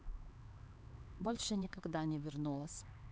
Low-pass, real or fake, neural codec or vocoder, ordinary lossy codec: none; fake; codec, 16 kHz, 4 kbps, X-Codec, HuBERT features, trained on LibriSpeech; none